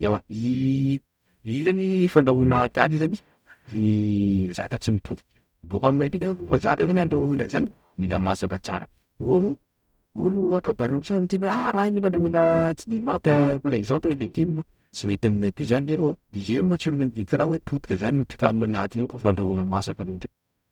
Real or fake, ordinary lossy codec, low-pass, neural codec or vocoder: fake; none; 19.8 kHz; codec, 44.1 kHz, 0.9 kbps, DAC